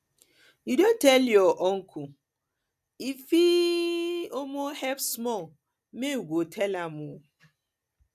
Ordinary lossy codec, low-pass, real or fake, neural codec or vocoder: none; 14.4 kHz; real; none